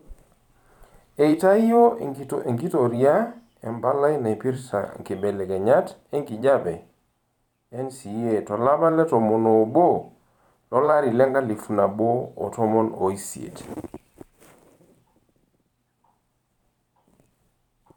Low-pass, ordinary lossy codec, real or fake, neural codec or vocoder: 19.8 kHz; none; fake; vocoder, 44.1 kHz, 128 mel bands every 512 samples, BigVGAN v2